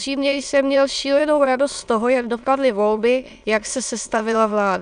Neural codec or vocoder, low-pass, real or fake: autoencoder, 22.05 kHz, a latent of 192 numbers a frame, VITS, trained on many speakers; 9.9 kHz; fake